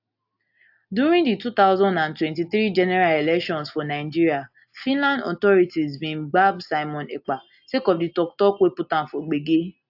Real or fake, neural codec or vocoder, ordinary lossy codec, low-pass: real; none; AAC, 48 kbps; 5.4 kHz